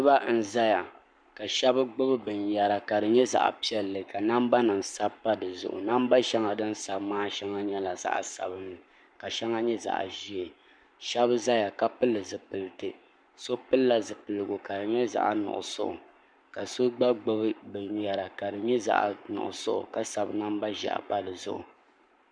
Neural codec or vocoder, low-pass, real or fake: codec, 44.1 kHz, 7.8 kbps, Pupu-Codec; 9.9 kHz; fake